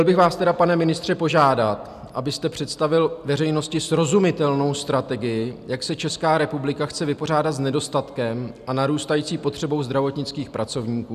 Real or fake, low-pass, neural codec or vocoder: real; 14.4 kHz; none